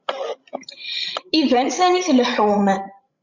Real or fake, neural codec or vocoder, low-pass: fake; codec, 16 kHz, 16 kbps, FreqCodec, larger model; 7.2 kHz